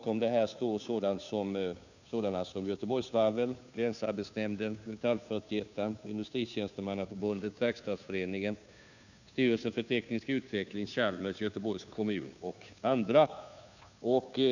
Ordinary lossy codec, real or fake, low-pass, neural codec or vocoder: none; fake; 7.2 kHz; codec, 16 kHz, 2 kbps, FunCodec, trained on Chinese and English, 25 frames a second